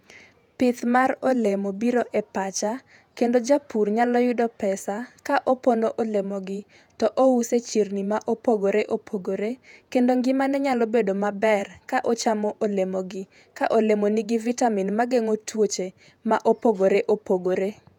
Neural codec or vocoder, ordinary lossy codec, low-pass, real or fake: vocoder, 48 kHz, 128 mel bands, Vocos; none; 19.8 kHz; fake